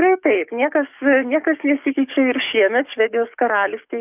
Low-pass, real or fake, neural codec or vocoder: 3.6 kHz; fake; codec, 44.1 kHz, 7.8 kbps, DAC